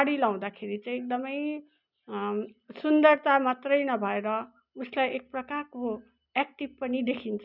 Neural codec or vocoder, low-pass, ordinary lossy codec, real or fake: none; 5.4 kHz; none; real